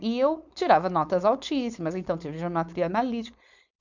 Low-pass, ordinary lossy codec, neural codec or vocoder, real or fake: 7.2 kHz; none; codec, 16 kHz, 4.8 kbps, FACodec; fake